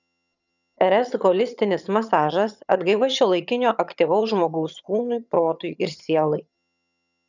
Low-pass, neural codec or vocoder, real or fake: 7.2 kHz; vocoder, 22.05 kHz, 80 mel bands, HiFi-GAN; fake